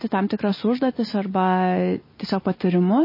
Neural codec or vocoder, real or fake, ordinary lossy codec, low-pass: none; real; MP3, 24 kbps; 5.4 kHz